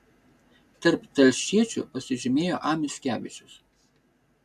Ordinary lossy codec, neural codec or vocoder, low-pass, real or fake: AAC, 96 kbps; none; 14.4 kHz; real